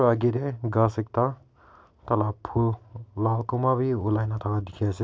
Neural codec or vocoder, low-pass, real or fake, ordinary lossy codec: none; none; real; none